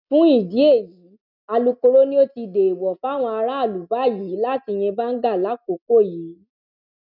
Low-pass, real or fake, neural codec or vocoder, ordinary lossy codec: 5.4 kHz; real; none; none